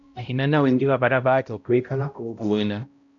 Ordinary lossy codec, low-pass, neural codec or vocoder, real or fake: MP3, 64 kbps; 7.2 kHz; codec, 16 kHz, 0.5 kbps, X-Codec, HuBERT features, trained on balanced general audio; fake